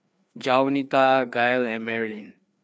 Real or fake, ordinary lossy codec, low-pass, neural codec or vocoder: fake; none; none; codec, 16 kHz, 2 kbps, FreqCodec, larger model